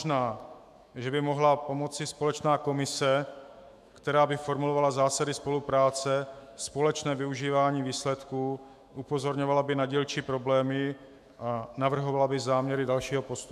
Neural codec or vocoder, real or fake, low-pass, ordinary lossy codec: autoencoder, 48 kHz, 128 numbers a frame, DAC-VAE, trained on Japanese speech; fake; 14.4 kHz; AAC, 96 kbps